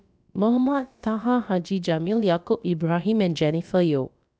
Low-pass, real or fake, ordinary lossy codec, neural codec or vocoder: none; fake; none; codec, 16 kHz, about 1 kbps, DyCAST, with the encoder's durations